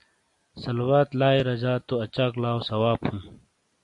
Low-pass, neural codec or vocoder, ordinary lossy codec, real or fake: 10.8 kHz; none; AAC, 64 kbps; real